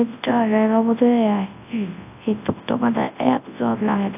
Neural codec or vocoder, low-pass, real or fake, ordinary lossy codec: codec, 24 kHz, 0.9 kbps, WavTokenizer, large speech release; 3.6 kHz; fake; AAC, 32 kbps